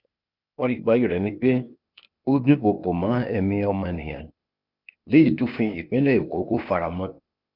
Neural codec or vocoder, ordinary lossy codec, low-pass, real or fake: codec, 16 kHz, 0.8 kbps, ZipCodec; none; 5.4 kHz; fake